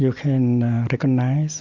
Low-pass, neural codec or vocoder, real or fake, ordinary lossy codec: 7.2 kHz; none; real; Opus, 64 kbps